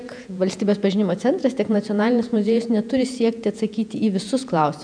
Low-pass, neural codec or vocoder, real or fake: 9.9 kHz; vocoder, 48 kHz, 128 mel bands, Vocos; fake